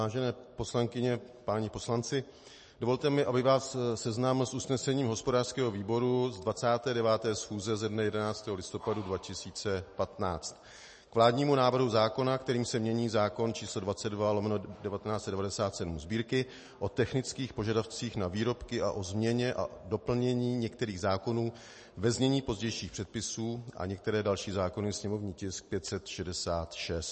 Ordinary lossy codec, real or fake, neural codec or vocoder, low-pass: MP3, 32 kbps; real; none; 9.9 kHz